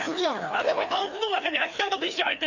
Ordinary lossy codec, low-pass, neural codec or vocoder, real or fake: none; 7.2 kHz; codec, 16 kHz, 2 kbps, FreqCodec, larger model; fake